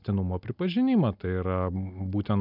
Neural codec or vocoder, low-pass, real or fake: none; 5.4 kHz; real